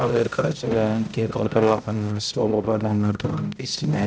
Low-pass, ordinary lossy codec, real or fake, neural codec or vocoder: none; none; fake; codec, 16 kHz, 0.5 kbps, X-Codec, HuBERT features, trained on balanced general audio